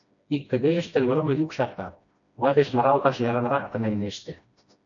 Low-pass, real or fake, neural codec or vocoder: 7.2 kHz; fake; codec, 16 kHz, 1 kbps, FreqCodec, smaller model